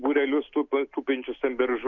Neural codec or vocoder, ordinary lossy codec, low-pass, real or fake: none; Opus, 64 kbps; 7.2 kHz; real